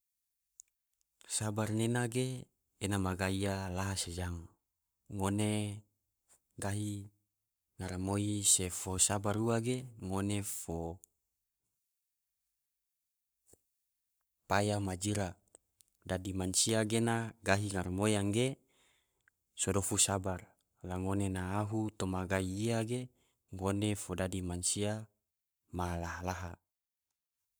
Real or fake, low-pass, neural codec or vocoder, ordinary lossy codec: fake; none; codec, 44.1 kHz, 7.8 kbps, Pupu-Codec; none